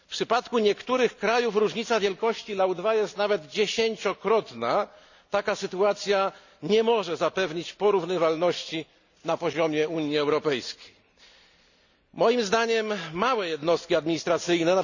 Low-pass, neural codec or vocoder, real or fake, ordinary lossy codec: 7.2 kHz; none; real; none